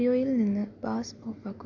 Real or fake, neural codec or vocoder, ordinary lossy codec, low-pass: real; none; none; 7.2 kHz